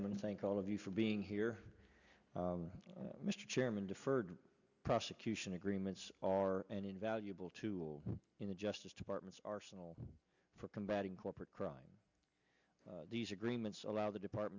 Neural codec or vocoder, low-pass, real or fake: none; 7.2 kHz; real